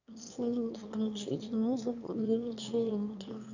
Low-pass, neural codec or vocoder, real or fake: 7.2 kHz; autoencoder, 22.05 kHz, a latent of 192 numbers a frame, VITS, trained on one speaker; fake